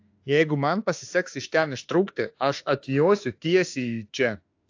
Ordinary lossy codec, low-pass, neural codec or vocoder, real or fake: AAC, 48 kbps; 7.2 kHz; autoencoder, 48 kHz, 32 numbers a frame, DAC-VAE, trained on Japanese speech; fake